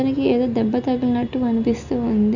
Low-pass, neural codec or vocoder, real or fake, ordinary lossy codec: 7.2 kHz; none; real; none